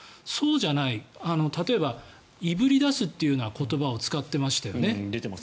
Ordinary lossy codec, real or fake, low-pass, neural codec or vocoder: none; real; none; none